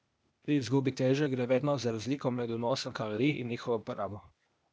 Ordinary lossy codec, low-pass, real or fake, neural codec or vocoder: none; none; fake; codec, 16 kHz, 0.8 kbps, ZipCodec